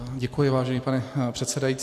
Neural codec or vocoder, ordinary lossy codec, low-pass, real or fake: none; AAC, 64 kbps; 14.4 kHz; real